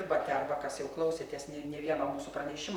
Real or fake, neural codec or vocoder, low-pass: fake; vocoder, 44.1 kHz, 128 mel bands, Pupu-Vocoder; 19.8 kHz